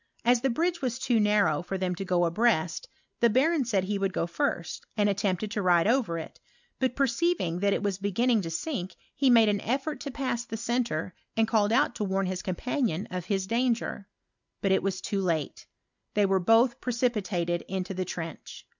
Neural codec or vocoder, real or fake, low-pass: none; real; 7.2 kHz